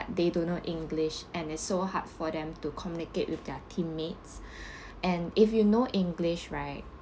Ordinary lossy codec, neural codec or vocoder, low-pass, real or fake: none; none; none; real